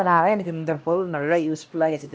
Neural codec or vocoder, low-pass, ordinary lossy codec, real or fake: codec, 16 kHz, 1 kbps, X-Codec, HuBERT features, trained on LibriSpeech; none; none; fake